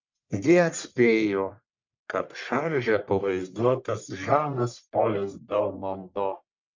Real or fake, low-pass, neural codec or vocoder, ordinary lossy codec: fake; 7.2 kHz; codec, 44.1 kHz, 1.7 kbps, Pupu-Codec; MP3, 64 kbps